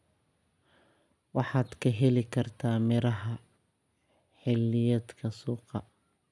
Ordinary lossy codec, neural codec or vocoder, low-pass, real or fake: none; none; none; real